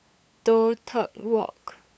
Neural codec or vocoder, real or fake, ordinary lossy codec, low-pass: codec, 16 kHz, 8 kbps, FunCodec, trained on LibriTTS, 25 frames a second; fake; none; none